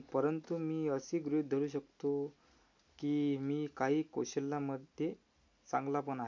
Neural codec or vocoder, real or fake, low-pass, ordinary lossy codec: none; real; 7.2 kHz; MP3, 48 kbps